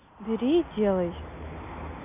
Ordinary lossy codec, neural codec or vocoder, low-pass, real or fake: none; none; 3.6 kHz; real